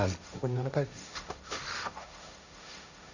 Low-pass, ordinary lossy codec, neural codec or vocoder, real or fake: 7.2 kHz; none; codec, 16 kHz, 1.1 kbps, Voila-Tokenizer; fake